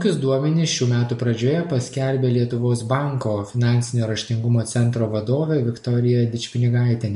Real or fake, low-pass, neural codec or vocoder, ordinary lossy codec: real; 14.4 kHz; none; MP3, 48 kbps